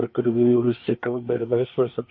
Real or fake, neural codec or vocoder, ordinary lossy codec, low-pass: fake; codec, 24 kHz, 1 kbps, SNAC; MP3, 32 kbps; 7.2 kHz